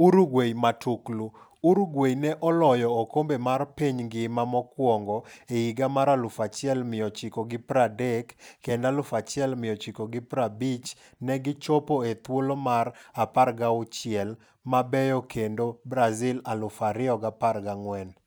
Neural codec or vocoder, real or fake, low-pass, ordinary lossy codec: none; real; none; none